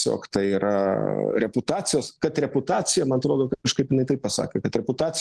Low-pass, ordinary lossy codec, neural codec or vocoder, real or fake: 10.8 kHz; Opus, 16 kbps; none; real